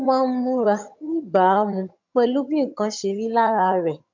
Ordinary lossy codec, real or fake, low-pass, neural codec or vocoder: MP3, 64 kbps; fake; 7.2 kHz; vocoder, 22.05 kHz, 80 mel bands, HiFi-GAN